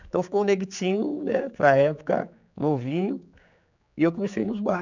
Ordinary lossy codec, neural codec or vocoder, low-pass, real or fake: none; codec, 16 kHz, 4 kbps, X-Codec, HuBERT features, trained on general audio; 7.2 kHz; fake